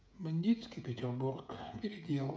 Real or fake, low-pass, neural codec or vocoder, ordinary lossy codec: fake; none; codec, 16 kHz, 16 kbps, FreqCodec, smaller model; none